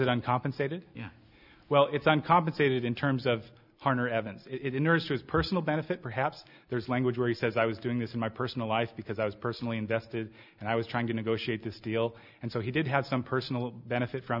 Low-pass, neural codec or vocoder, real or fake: 5.4 kHz; none; real